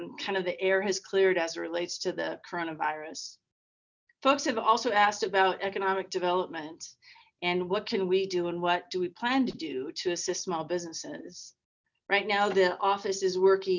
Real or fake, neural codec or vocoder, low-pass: real; none; 7.2 kHz